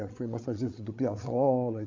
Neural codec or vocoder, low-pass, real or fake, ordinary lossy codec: codec, 16 kHz, 8 kbps, FreqCodec, larger model; 7.2 kHz; fake; MP3, 48 kbps